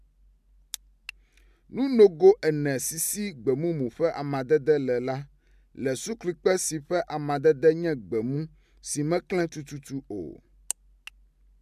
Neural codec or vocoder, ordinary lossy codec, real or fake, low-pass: none; MP3, 96 kbps; real; 14.4 kHz